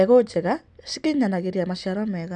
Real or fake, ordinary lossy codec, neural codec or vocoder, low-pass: real; none; none; none